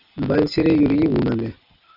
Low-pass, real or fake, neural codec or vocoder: 5.4 kHz; real; none